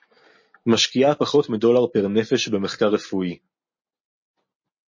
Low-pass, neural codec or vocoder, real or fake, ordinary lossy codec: 7.2 kHz; none; real; MP3, 32 kbps